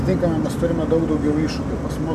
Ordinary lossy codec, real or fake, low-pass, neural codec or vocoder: MP3, 96 kbps; real; 14.4 kHz; none